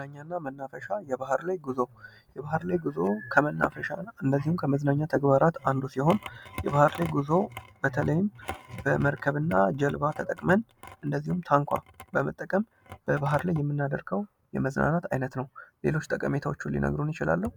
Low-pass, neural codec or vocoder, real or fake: 19.8 kHz; none; real